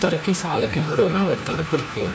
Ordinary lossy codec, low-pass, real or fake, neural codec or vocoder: none; none; fake; codec, 16 kHz, 1 kbps, FunCodec, trained on LibriTTS, 50 frames a second